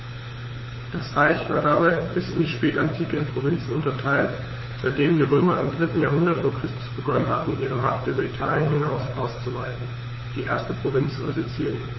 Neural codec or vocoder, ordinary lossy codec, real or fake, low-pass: codec, 16 kHz, 4 kbps, FunCodec, trained on LibriTTS, 50 frames a second; MP3, 24 kbps; fake; 7.2 kHz